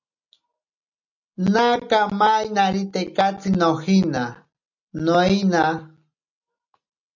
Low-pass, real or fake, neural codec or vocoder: 7.2 kHz; real; none